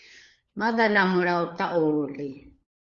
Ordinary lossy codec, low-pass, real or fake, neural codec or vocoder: Opus, 64 kbps; 7.2 kHz; fake; codec, 16 kHz, 4 kbps, FunCodec, trained on LibriTTS, 50 frames a second